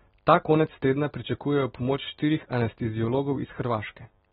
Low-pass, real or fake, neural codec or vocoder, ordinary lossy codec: 10.8 kHz; real; none; AAC, 16 kbps